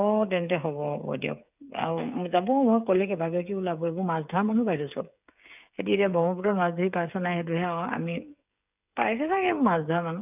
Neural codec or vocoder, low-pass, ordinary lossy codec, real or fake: codec, 16 kHz, 8 kbps, FreqCodec, smaller model; 3.6 kHz; none; fake